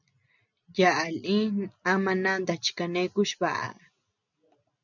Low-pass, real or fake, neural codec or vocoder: 7.2 kHz; real; none